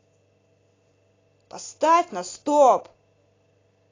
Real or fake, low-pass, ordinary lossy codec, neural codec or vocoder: real; 7.2 kHz; AAC, 32 kbps; none